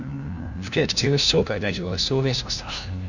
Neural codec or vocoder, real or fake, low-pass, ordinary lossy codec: codec, 16 kHz, 1 kbps, FunCodec, trained on LibriTTS, 50 frames a second; fake; 7.2 kHz; none